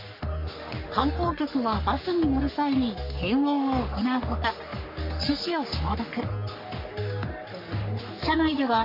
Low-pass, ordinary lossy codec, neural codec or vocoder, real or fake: 5.4 kHz; MP3, 32 kbps; codec, 44.1 kHz, 3.4 kbps, Pupu-Codec; fake